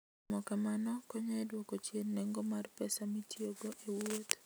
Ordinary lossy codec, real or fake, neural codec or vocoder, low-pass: none; real; none; none